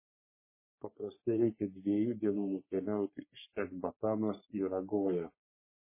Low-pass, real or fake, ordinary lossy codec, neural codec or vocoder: 3.6 kHz; fake; MP3, 16 kbps; codec, 44.1 kHz, 3.4 kbps, Pupu-Codec